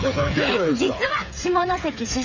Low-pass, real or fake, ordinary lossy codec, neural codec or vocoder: 7.2 kHz; fake; none; codec, 16 kHz, 8 kbps, FreqCodec, smaller model